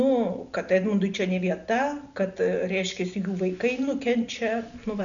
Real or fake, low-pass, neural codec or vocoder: real; 7.2 kHz; none